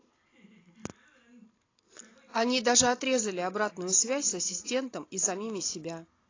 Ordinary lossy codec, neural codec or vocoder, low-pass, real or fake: AAC, 32 kbps; none; 7.2 kHz; real